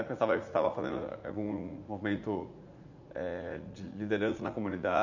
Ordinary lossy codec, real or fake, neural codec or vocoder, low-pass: none; fake; vocoder, 44.1 kHz, 80 mel bands, Vocos; 7.2 kHz